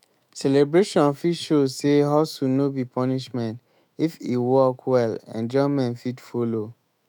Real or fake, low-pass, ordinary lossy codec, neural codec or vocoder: fake; 19.8 kHz; none; autoencoder, 48 kHz, 128 numbers a frame, DAC-VAE, trained on Japanese speech